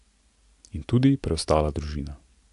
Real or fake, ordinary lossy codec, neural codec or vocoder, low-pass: real; AAC, 64 kbps; none; 10.8 kHz